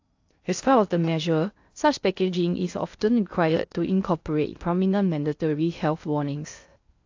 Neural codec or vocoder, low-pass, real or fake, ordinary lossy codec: codec, 16 kHz in and 24 kHz out, 0.6 kbps, FocalCodec, streaming, 2048 codes; 7.2 kHz; fake; AAC, 48 kbps